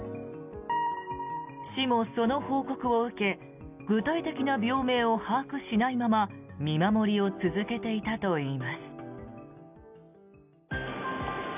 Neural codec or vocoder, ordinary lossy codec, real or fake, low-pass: none; none; real; 3.6 kHz